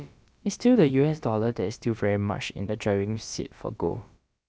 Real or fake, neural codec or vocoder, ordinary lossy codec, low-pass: fake; codec, 16 kHz, about 1 kbps, DyCAST, with the encoder's durations; none; none